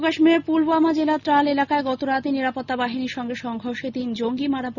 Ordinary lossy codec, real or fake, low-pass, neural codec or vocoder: none; real; 7.2 kHz; none